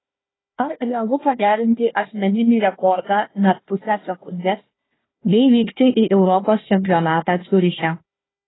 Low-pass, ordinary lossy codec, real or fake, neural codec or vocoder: 7.2 kHz; AAC, 16 kbps; fake; codec, 16 kHz, 1 kbps, FunCodec, trained on Chinese and English, 50 frames a second